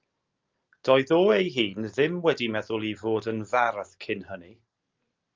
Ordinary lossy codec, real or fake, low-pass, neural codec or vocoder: Opus, 24 kbps; real; 7.2 kHz; none